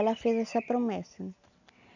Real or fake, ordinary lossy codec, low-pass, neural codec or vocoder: real; none; 7.2 kHz; none